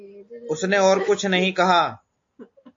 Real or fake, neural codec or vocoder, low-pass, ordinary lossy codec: real; none; 7.2 kHz; AAC, 48 kbps